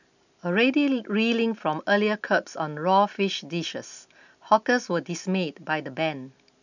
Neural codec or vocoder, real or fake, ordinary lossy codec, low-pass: none; real; none; 7.2 kHz